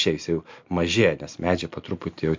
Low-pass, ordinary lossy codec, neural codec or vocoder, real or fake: 7.2 kHz; MP3, 48 kbps; none; real